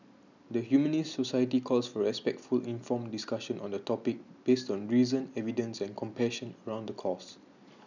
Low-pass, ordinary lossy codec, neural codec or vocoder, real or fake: 7.2 kHz; none; none; real